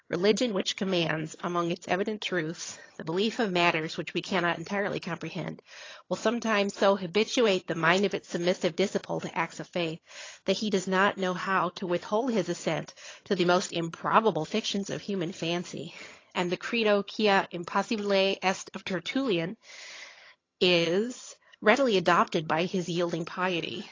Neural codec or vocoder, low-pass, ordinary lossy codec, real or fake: vocoder, 22.05 kHz, 80 mel bands, HiFi-GAN; 7.2 kHz; AAC, 32 kbps; fake